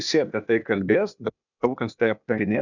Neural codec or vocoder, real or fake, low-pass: codec, 16 kHz, 0.8 kbps, ZipCodec; fake; 7.2 kHz